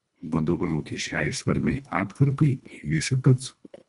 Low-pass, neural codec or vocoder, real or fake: 10.8 kHz; codec, 24 kHz, 1.5 kbps, HILCodec; fake